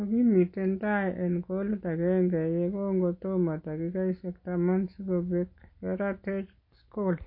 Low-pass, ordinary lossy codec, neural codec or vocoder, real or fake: 5.4 kHz; MP3, 32 kbps; none; real